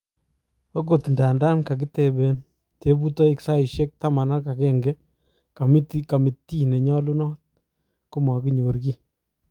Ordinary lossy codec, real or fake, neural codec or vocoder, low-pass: Opus, 32 kbps; fake; autoencoder, 48 kHz, 128 numbers a frame, DAC-VAE, trained on Japanese speech; 19.8 kHz